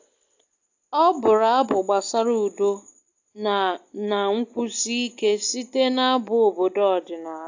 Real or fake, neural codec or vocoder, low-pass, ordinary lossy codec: real; none; 7.2 kHz; AAC, 48 kbps